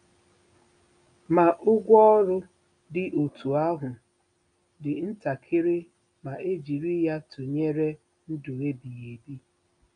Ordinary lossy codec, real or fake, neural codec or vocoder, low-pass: none; real; none; 9.9 kHz